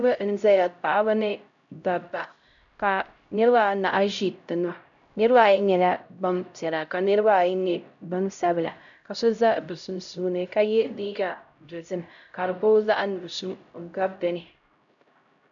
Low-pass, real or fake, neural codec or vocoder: 7.2 kHz; fake; codec, 16 kHz, 0.5 kbps, X-Codec, HuBERT features, trained on LibriSpeech